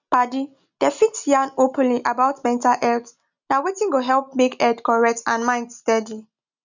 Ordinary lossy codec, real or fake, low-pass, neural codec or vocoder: none; real; 7.2 kHz; none